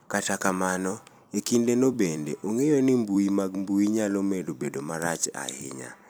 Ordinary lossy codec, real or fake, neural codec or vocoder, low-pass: none; fake; vocoder, 44.1 kHz, 128 mel bands every 256 samples, BigVGAN v2; none